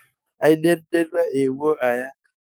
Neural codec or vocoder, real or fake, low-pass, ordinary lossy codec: codec, 44.1 kHz, 7.8 kbps, DAC; fake; none; none